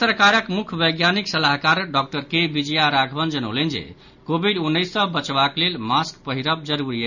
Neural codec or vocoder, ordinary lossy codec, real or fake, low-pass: none; none; real; 7.2 kHz